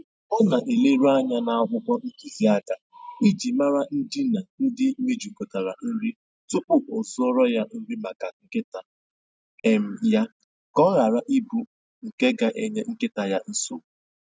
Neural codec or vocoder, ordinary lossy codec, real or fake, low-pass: none; none; real; none